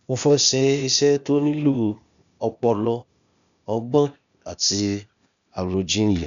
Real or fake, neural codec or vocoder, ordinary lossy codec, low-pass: fake; codec, 16 kHz, 0.8 kbps, ZipCodec; none; 7.2 kHz